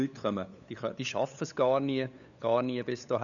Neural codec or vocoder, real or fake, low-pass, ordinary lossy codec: codec, 16 kHz, 8 kbps, FunCodec, trained on LibriTTS, 25 frames a second; fake; 7.2 kHz; none